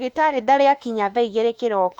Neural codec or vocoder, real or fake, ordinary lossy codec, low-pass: autoencoder, 48 kHz, 32 numbers a frame, DAC-VAE, trained on Japanese speech; fake; Opus, 64 kbps; 19.8 kHz